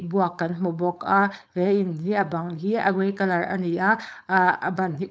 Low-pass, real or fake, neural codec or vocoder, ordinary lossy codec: none; fake; codec, 16 kHz, 4.8 kbps, FACodec; none